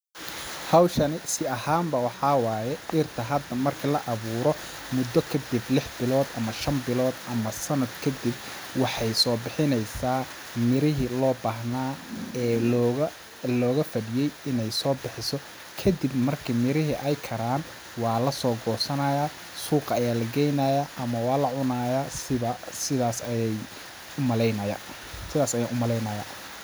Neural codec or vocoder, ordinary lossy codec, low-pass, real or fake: none; none; none; real